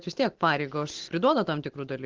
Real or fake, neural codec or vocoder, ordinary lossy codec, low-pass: real; none; Opus, 16 kbps; 7.2 kHz